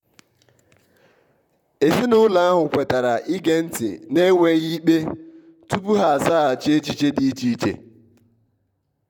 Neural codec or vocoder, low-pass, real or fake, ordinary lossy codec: vocoder, 44.1 kHz, 128 mel bands, Pupu-Vocoder; 19.8 kHz; fake; none